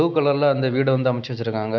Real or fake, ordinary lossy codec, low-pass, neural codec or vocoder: real; none; 7.2 kHz; none